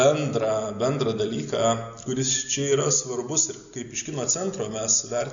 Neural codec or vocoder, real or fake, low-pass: none; real; 7.2 kHz